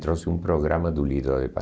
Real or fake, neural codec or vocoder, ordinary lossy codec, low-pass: real; none; none; none